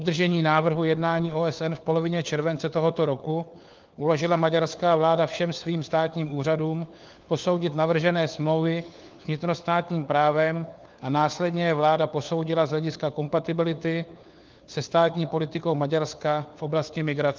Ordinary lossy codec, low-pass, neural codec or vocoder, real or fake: Opus, 24 kbps; 7.2 kHz; codec, 16 kHz, 4 kbps, FunCodec, trained on LibriTTS, 50 frames a second; fake